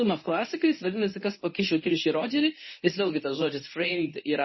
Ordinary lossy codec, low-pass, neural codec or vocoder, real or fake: MP3, 24 kbps; 7.2 kHz; codec, 24 kHz, 0.9 kbps, WavTokenizer, medium speech release version 1; fake